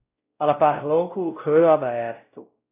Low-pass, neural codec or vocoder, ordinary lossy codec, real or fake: 3.6 kHz; codec, 16 kHz, 0.5 kbps, X-Codec, WavLM features, trained on Multilingual LibriSpeech; AAC, 24 kbps; fake